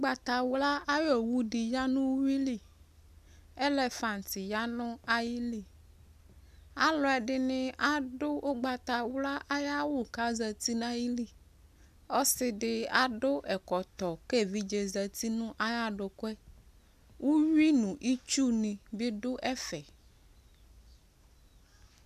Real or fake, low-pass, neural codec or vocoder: real; 14.4 kHz; none